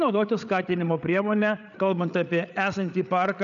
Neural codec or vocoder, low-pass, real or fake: codec, 16 kHz, 8 kbps, FreqCodec, larger model; 7.2 kHz; fake